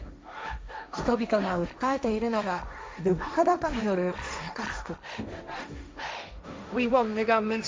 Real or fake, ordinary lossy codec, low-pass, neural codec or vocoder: fake; none; none; codec, 16 kHz, 1.1 kbps, Voila-Tokenizer